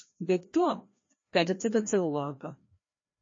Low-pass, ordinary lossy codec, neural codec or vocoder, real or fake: 7.2 kHz; MP3, 32 kbps; codec, 16 kHz, 1 kbps, FreqCodec, larger model; fake